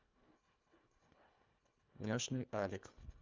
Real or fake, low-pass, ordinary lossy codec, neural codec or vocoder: fake; 7.2 kHz; Opus, 24 kbps; codec, 24 kHz, 1.5 kbps, HILCodec